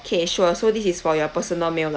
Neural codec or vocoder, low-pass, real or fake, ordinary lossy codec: none; none; real; none